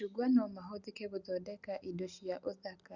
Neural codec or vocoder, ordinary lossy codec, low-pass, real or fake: none; Opus, 64 kbps; 7.2 kHz; real